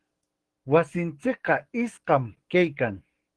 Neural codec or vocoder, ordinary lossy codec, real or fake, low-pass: codec, 44.1 kHz, 7.8 kbps, DAC; Opus, 16 kbps; fake; 10.8 kHz